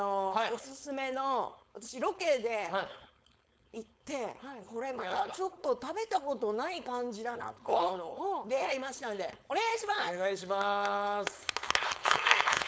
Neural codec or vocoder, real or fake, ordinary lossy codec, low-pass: codec, 16 kHz, 4.8 kbps, FACodec; fake; none; none